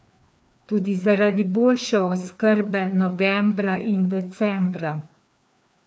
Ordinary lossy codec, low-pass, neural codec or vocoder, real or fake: none; none; codec, 16 kHz, 2 kbps, FreqCodec, larger model; fake